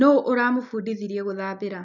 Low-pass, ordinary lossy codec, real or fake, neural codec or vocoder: 7.2 kHz; none; real; none